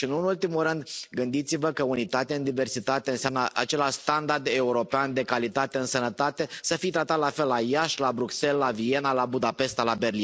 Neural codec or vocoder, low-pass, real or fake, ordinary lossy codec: none; none; real; none